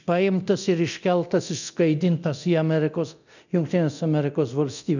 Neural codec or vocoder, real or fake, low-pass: codec, 24 kHz, 0.9 kbps, DualCodec; fake; 7.2 kHz